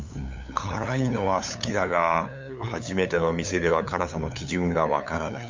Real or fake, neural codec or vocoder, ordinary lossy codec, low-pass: fake; codec, 16 kHz, 8 kbps, FunCodec, trained on LibriTTS, 25 frames a second; MP3, 64 kbps; 7.2 kHz